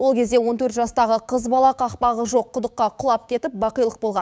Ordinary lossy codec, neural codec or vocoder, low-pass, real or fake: none; codec, 16 kHz, 6 kbps, DAC; none; fake